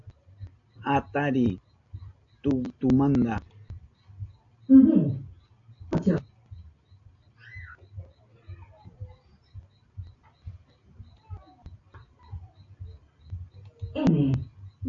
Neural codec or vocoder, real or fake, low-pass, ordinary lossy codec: none; real; 7.2 kHz; AAC, 48 kbps